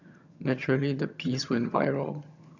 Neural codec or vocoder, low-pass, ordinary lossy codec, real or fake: vocoder, 22.05 kHz, 80 mel bands, HiFi-GAN; 7.2 kHz; none; fake